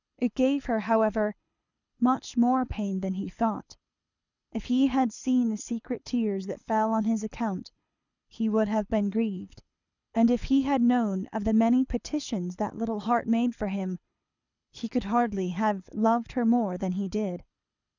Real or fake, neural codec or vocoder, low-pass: fake; codec, 24 kHz, 6 kbps, HILCodec; 7.2 kHz